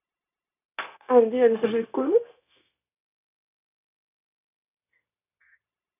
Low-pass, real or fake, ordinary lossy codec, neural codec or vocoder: 3.6 kHz; fake; none; codec, 16 kHz, 0.9 kbps, LongCat-Audio-Codec